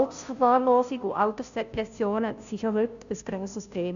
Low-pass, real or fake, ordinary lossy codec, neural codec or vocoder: 7.2 kHz; fake; none; codec, 16 kHz, 0.5 kbps, FunCodec, trained on Chinese and English, 25 frames a second